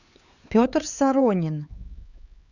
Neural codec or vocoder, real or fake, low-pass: codec, 16 kHz, 4 kbps, X-Codec, HuBERT features, trained on LibriSpeech; fake; 7.2 kHz